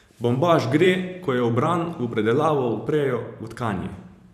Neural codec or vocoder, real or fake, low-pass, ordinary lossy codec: vocoder, 44.1 kHz, 128 mel bands every 512 samples, BigVGAN v2; fake; 14.4 kHz; none